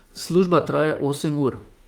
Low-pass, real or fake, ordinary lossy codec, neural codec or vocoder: 19.8 kHz; fake; Opus, 32 kbps; autoencoder, 48 kHz, 32 numbers a frame, DAC-VAE, trained on Japanese speech